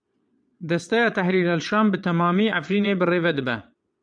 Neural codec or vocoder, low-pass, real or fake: vocoder, 22.05 kHz, 80 mel bands, Vocos; 9.9 kHz; fake